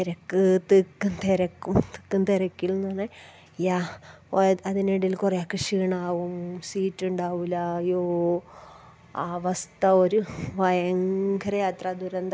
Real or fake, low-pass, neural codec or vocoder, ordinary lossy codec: real; none; none; none